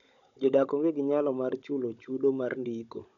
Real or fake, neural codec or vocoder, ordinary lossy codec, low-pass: fake; codec, 16 kHz, 16 kbps, FunCodec, trained on Chinese and English, 50 frames a second; none; 7.2 kHz